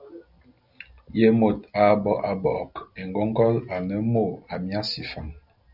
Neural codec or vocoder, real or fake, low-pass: none; real; 5.4 kHz